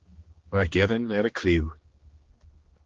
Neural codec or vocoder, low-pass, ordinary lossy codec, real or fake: codec, 16 kHz, 2 kbps, X-Codec, HuBERT features, trained on general audio; 7.2 kHz; Opus, 16 kbps; fake